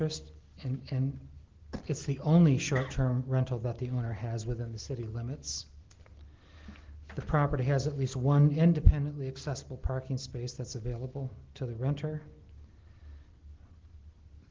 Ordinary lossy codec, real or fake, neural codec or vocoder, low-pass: Opus, 16 kbps; real; none; 7.2 kHz